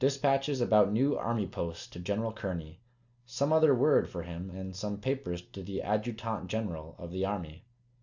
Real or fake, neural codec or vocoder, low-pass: real; none; 7.2 kHz